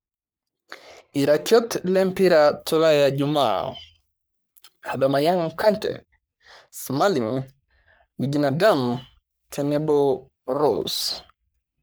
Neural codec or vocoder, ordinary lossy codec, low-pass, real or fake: codec, 44.1 kHz, 3.4 kbps, Pupu-Codec; none; none; fake